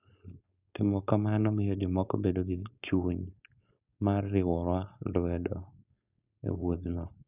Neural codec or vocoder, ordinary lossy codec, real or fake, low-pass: codec, 16 kHz, 4.8 kbps, FACodec; none; fake; 3.6 kHz